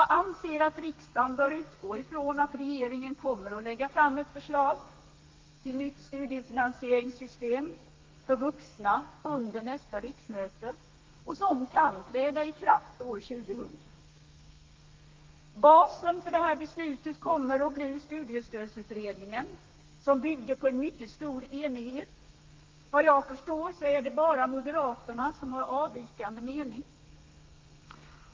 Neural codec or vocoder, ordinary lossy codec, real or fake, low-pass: codec, 32 kHz, 1.9 kbps, SNAC; Opus, 32 kbps; fake; 7.2 kHz